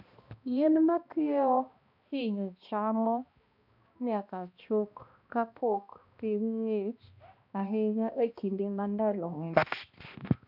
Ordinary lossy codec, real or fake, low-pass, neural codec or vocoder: none; fake; 5.4 kHz; codec, 16 kHz, 1 kbps, X-Codec, HuBERT features, trained on balanced general audio